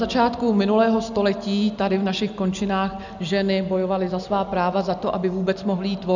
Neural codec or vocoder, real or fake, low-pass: none; real; 7.2 kHz